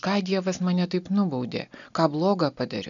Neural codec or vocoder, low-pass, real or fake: none; 7.2 kHz; real